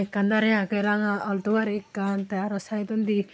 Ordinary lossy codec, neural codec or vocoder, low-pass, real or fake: none; none; none; real